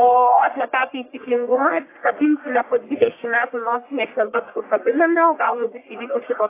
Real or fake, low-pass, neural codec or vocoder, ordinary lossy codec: fake; 3.6 kHz; codec, 44.1 kHz, 1.7 kbps, Pupu-Codec; AAC, 24 kbps